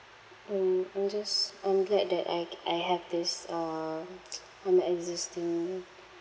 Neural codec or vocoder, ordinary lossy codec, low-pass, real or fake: none; none; none; real